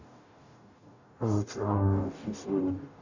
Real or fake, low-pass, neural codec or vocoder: fake; 7.2 kHz; codec, 44.1 kHz, 0.9 kbps, DAC